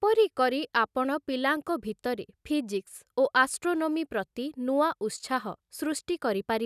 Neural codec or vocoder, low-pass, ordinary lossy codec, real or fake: none; 14.4 kHz; none; real